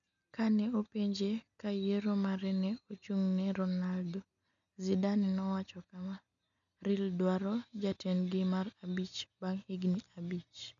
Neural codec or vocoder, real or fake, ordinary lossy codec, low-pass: none; real; none; 7.2 kHz